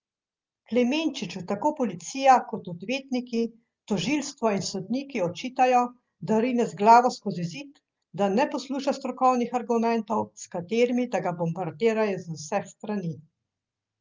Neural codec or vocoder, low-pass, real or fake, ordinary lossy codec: none; 7.2 kHz; real; Opus, 32 kbps